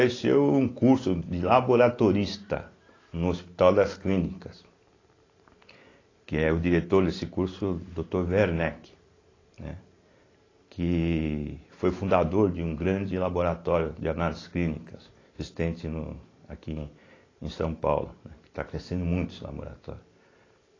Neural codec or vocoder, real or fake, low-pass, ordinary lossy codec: none; real; 7.2 kHz; AAC, 32 kbps